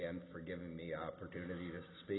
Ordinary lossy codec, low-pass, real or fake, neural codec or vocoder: AAC, 16 kbps; 7.2 kHz; real; none